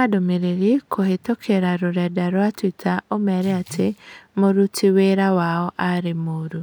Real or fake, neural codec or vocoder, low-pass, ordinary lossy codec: real; none; none; none